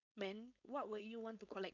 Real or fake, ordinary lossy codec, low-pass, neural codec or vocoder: fake; none; 7.2 kHz; codec, 16 kHz, 4.8 kbps, FACodec